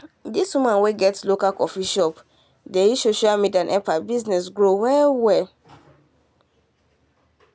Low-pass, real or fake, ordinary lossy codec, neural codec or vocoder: none; real; none; none